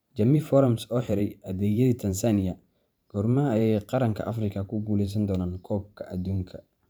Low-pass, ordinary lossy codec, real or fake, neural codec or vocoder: none; none; fake; vocoder, 44.1 kHz, 128 mel bands every 512 samples, BigVGAN v2